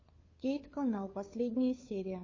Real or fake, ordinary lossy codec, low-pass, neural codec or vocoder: fake; MP3, 32 kbps; 7.2 kHz; codec, 16 kHz, 2 kbps, FunCodec, trained on Chinese and English, 25 frames a second